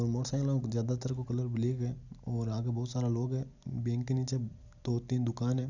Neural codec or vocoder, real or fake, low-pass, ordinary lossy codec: none; real; 7.2 kHz; none